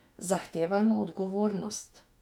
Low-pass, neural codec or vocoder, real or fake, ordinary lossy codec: 19.8 kHz; autoencoder, 48 kHz, 32 numbers a frame, DAC-VAE, trained on Japanese speech; fake; none